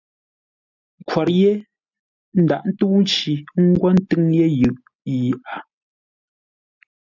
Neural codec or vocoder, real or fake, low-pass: none; real; 7.2 kHz